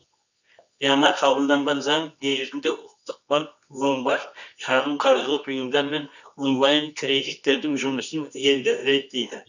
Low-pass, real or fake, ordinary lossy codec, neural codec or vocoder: 7.2 kHz; fake; none; codec, 24 kHz, 0.9 kbps, WavTokenizer, medium music audio release